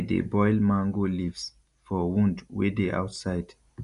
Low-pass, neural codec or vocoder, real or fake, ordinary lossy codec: 10.8 kHz; none; real; none